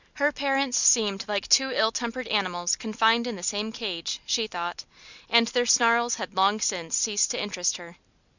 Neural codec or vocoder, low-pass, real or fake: none; 7.2 kHz; real